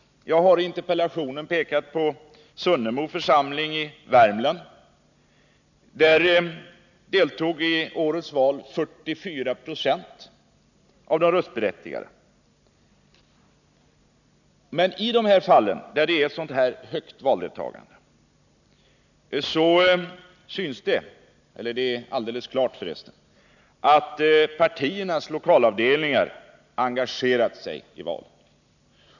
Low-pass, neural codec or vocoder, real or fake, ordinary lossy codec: 7.2 kHz; none; real; none